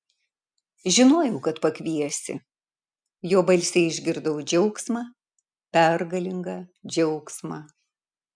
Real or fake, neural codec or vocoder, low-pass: real; none; 9.9 kHz